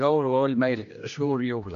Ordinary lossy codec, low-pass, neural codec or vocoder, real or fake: AAC, 96 kbps; 7.2 kHz; codec, 16 kHz, 1 kbps, X-Codec, HuBERT features, trained on general audio; fake